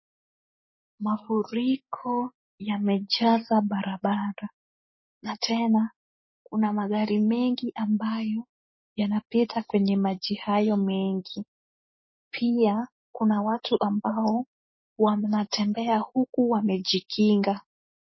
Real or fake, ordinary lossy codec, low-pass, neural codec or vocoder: real; MP3, 24 kbps; 7.2 kHz; none